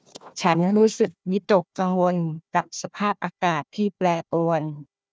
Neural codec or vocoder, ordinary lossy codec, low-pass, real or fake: codec, 16 kHz, 1 kbps, FunCodec, trained on Chinese and English, 50 frames a second; none; none; fake